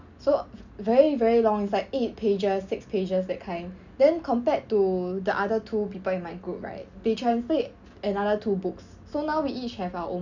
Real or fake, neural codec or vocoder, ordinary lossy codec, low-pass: real; none; none; 7.2 kHz